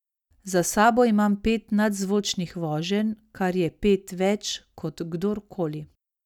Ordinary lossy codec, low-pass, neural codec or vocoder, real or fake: none; 19.8 kHz; none; real